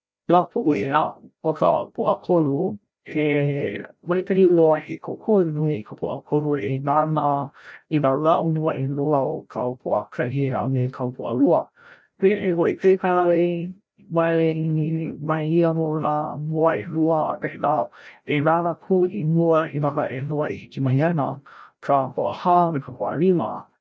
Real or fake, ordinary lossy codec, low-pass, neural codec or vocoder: fake; none; none; codec, 16 kHz, 0.5 kbps, FreqCodec, larger model